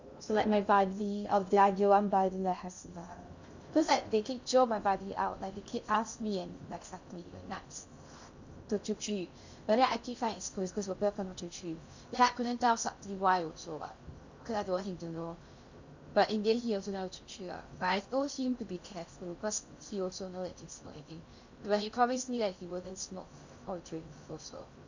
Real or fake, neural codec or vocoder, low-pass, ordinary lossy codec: fake; codec, 16 kHz in and 24 kHz out, 0.6 kbps, FocalCodec, streaming, 2048 codes; 7.2 kHz; Opus, 64 kbps